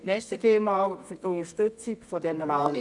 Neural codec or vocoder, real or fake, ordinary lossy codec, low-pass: codec, 24 kHz, 0.9 kbps, WavTokenizer, medium music audio release; fake; none; 10.8 kHz